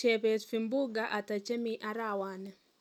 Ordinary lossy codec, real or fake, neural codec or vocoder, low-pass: none; real; none; 19.8 kHz